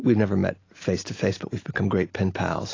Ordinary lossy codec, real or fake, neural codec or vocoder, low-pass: AAC, 32 kbps; real; none; 7.2 kHz